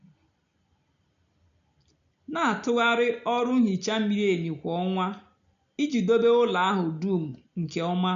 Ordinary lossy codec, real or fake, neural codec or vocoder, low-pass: none; real; none; 7.2 kHz